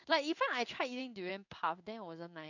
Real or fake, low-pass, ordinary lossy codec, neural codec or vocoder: fake; 7.2 kHz; none; codec, 16 kHz in and 24 kHz out, 1 kbps, XY-Tokenizer